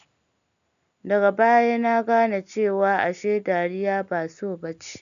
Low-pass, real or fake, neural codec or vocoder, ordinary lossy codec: 7.2 kHz; real; none; none